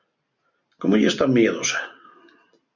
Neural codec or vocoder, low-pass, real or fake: none; 7.2 kHz; real